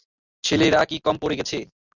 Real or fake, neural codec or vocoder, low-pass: real; none; 7.2 kHz